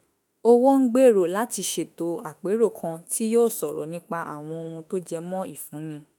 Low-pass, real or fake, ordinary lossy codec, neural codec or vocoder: none; fake; none; autoencoder, 48 kHz, 32 numbers a frame, DAC-VAE, trained on Japanese speech